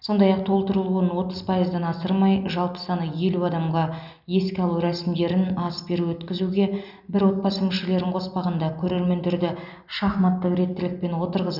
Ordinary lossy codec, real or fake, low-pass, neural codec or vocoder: none; real; 5.4 kHz; none